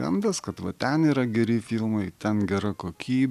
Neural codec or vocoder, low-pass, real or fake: none; 14.4 kHz; real